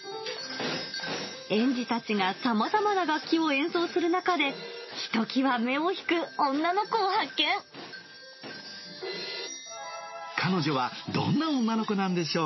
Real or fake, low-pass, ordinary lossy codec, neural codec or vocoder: real; 7.2 kHz; MP3, 24 kbps; none